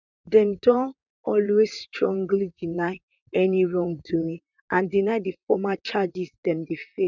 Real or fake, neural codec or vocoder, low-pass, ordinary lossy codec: fake; vocoder, 44.1 kHz, 128 mel bands, Pupu-Vocoder; 7.2 kHz; none